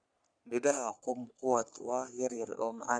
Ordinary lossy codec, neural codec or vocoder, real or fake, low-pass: none; codec, 44.1 kHz, 3.4 kbps, Pupu-Codec; fake; 9.9 kHz